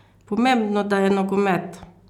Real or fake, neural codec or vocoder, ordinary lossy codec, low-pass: real; none; none; 19.8 kHz